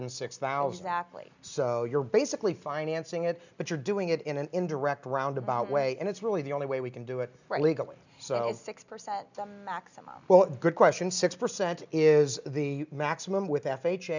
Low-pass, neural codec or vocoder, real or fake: 7.2 kHz; none; real